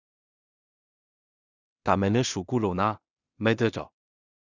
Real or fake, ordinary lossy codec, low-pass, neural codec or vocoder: fake; Opus, 64 kbps; 7.2 kHz; codec, 16 kHz in and 24 kHz out, 0.4 kbps, LongCat-Audio-Codec, two codebook decoder